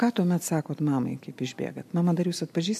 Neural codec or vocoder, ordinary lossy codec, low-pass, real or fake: none; MP3, 64 kbps; 14.4 kHz; real